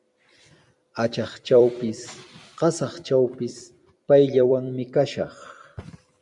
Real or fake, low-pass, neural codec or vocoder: fake; 10.8 kHz; vocoder, 44.1 kHz, 128 mel bands every 256 samples, BigVGAN v2